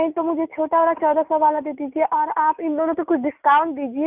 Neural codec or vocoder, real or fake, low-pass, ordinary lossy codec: none; real; 3.6 kHz; none